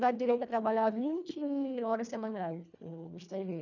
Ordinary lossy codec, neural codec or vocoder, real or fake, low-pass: none; codec, 24 kHz, 1.5 kbps, HILCodec; fake; 7.2 kHz